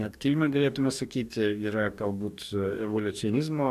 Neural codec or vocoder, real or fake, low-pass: codec, 44.1 kHz, 2.6 kbps, DAC; fake; 14.4 kHz